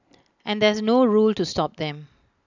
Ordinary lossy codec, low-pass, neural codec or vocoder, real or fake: none; 7.2 kHz; none; real